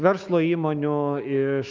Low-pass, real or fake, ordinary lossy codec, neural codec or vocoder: 7.2 kHz; fake; Opus, 24 kbps; autoencoder, 48 kHz, 128 numbers a frame, DAC-VAE, trained on Japanese speech